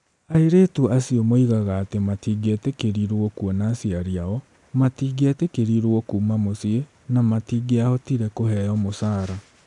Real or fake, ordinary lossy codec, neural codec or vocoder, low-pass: real; none; none; 10.8 kHz